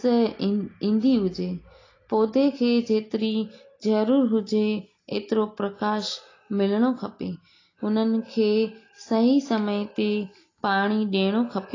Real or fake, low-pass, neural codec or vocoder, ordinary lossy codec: real; 7.2 kHz; none; AAC, 32 kbps